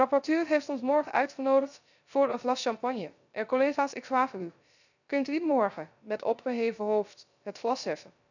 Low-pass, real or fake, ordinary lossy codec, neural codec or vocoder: 7.2 kHz; fake; none; codec, 16 kHz, 0.3 kbps, FocalCodec